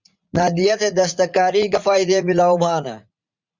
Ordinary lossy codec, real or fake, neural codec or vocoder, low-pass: Opus, 64 kbps; fake; vocoder, 44.1 kHz, 80 mel bands, Vocos; 7.2 kHz